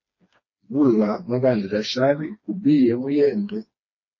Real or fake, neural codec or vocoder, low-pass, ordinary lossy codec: fake; codec, 16 kHz, 2 kbps, FreqCodec, smaller model; 7.2 kHz; MP3, 32 kbps